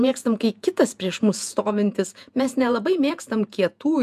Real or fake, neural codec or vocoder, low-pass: fake; vocoder, 48 kHz, 128 mel bands, Vocos; 14.4 kHz